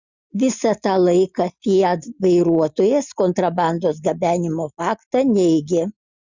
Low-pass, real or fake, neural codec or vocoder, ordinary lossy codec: 7.2 kHz; real; none; Opus, 64 kbps